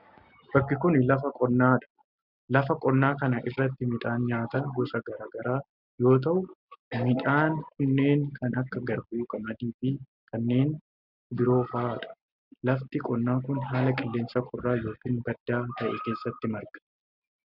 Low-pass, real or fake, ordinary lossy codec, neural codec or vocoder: 5.4 kHz; real; Opus, 64 kbps; none